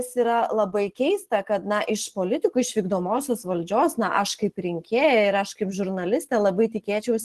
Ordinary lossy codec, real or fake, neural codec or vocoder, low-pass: Opus, 16 kbps; real; none; 14.4 kHz